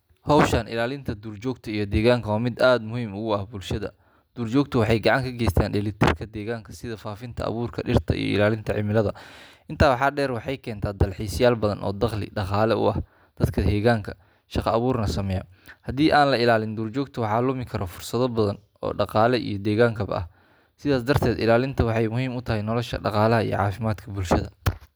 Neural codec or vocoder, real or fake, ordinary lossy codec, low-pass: none; real; none; none